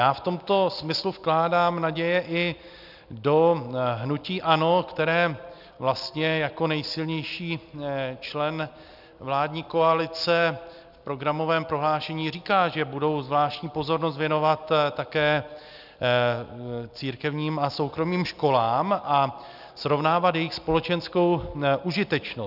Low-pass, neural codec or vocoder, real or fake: 5.4 kHz; none; real